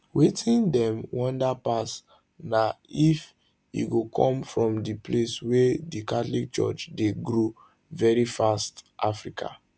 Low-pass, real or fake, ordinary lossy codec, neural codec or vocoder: none; real; none; none